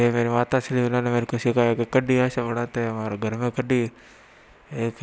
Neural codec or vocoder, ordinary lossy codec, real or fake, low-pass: none; none; real; none